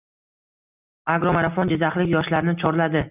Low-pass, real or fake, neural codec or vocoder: 3.6 kHz; real; none